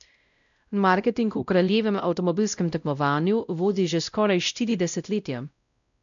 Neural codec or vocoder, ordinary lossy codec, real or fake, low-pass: codec, 16 kHz, 0.5 kbps, X-Codec, WavLM features, trained on Multilingual LibriSpeech; none; fake; 7.2 kHz